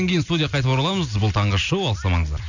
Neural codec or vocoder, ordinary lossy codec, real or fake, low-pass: none; none; real; 7.2 kHz